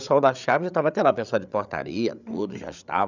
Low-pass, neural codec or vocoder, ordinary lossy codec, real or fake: 7.2 kHz; codec, 16 kHz, 8 kbps, FreqCodec, larger model; none; fake